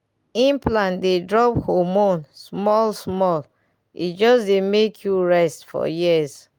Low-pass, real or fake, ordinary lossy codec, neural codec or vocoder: 19.8 kHz; real; Opus, 32 kbps; none